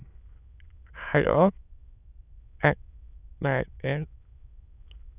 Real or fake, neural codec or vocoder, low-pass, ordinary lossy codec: fake; autoencoder, 22.05 kHz, a latent of 192 numbers a frame, VITS, trained on many speakers; 3.6 kHz; none